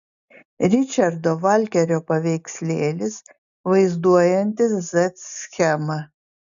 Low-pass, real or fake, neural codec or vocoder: 7.2 kHz; real; none